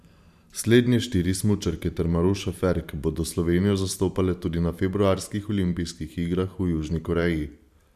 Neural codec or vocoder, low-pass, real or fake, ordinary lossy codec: vocoder, 44.1 kHz, 128 mel bands every 512 samples, BigVGAN v2; 14.4 kHz; fake; none